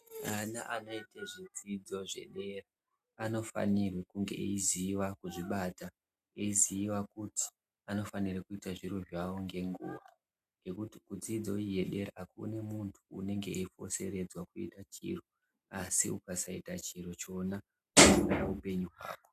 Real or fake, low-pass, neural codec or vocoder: real; 14.4 kHz; none